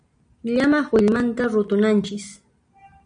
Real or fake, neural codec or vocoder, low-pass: real; none; 9.9 kHz